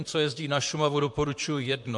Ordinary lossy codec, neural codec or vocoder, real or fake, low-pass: MP3, 64 kbps; vocoder, 44.1 kHz, 128 mel bands, Pupu-Vocoder; fake; 10.8 kHz